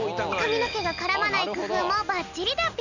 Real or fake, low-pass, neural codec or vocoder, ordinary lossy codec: real; 7.2 kHz; none; none